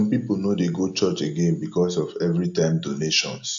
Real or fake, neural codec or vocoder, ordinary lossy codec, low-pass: real; none; AAC, 64 kbps; 7.2 kHz